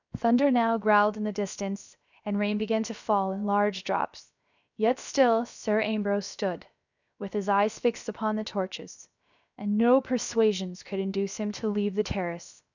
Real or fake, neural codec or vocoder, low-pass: fake; codec, 16 kHz, 0.7 kbps, FocalCodec; 7.2 kHz